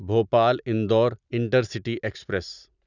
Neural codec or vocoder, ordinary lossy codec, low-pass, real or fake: none; none; 7.2 kHz; real